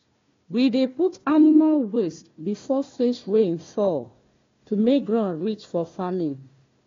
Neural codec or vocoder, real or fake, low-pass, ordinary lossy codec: codec, 16 kHz, 1 kbps, FunCodec, trained on Chinese and English, 50 frames a second; fake; 7.2 kHz; AAC, 32 kbps